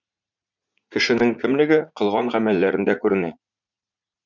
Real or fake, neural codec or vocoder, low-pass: fake; vocoder, 44.1 kHz, 80 mel bands, Vocos; 7.2 kHz